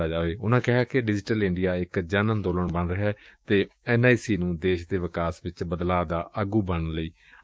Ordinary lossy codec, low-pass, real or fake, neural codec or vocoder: none; none; fake; codec, 16 kHz, 6 kbps, DAC